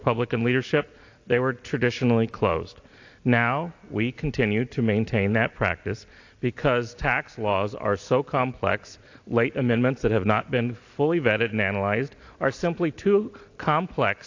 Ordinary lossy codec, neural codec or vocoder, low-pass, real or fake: AAC, 48 kbps; none; 7.2 kHz; real